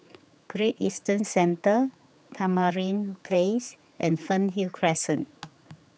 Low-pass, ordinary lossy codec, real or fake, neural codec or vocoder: none; none; fake; codec, 16 kHz, 4 kbps, X-Codec, HuBERT features, trained on general audio